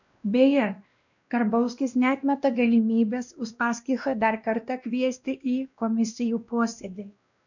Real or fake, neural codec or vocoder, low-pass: fake; codec, 16 kHz, 1 kbps, X-Codec, WavLM features, trained on Multilingual LibriSpeech; 7.2 kHz